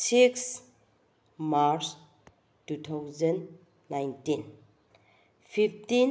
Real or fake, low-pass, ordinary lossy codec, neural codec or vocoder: real; none; none; none